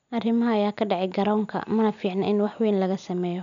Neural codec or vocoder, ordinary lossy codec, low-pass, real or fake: none; none; 7.2 kHz; real